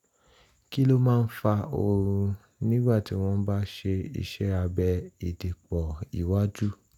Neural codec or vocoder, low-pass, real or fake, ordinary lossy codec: none; 19.8 kHz; real; none